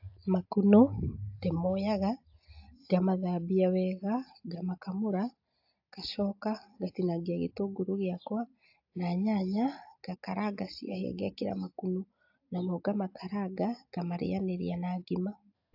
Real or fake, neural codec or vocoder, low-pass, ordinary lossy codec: real; none; 5.4 kHz; AAC, 48 kbps